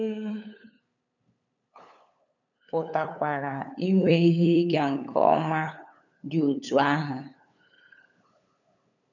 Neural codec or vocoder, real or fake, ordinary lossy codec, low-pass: codec, 16 kHz, 8 kbps, FunCodec, trained on LibriTTS, 25 frames a second; fake; none; 7.2 kHz